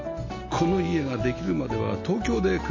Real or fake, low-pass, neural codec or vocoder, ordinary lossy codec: real; 7.2 kHz; none; MP3, 32 kbps